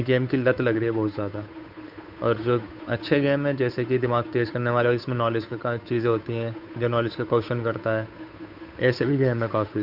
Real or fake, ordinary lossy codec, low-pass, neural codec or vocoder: fake; none; 5.4 kHz; codec, 16 kHz, 8 kbps, FunCodec, trained on Chinese and English, 25 frames a second